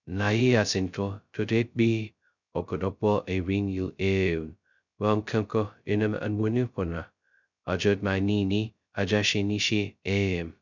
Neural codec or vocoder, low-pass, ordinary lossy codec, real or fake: codec, 16 kHz, 0.2 kbps, FocalCodec; 7.2 kHz; none; fake